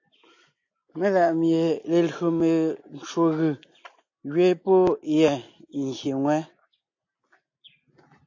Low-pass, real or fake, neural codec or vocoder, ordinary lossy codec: 7.2 kHz; real; none; AAC, 48 kbps